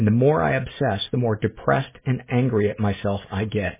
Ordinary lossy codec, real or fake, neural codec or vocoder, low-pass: MP3, 16 kbps; real; none; 3.6 kHz